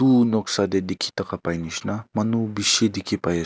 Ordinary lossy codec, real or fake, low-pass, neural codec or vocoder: none; real; none; none